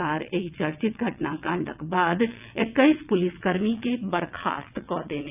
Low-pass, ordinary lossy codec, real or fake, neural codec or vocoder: 3.6 kHz; none; fake; vocoder, 22.05 kHz, 80 mel bands, WaveNeXt